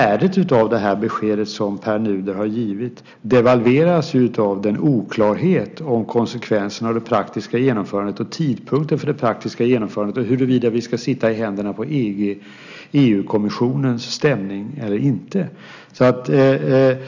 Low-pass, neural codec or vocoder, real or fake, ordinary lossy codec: 7.2 kHz; none; real; none